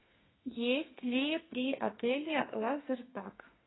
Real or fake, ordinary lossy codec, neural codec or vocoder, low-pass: fake; AAC, 16 kbps; codec, 32 kHz, 1.9 kbps, SNAC; 7.2 kHz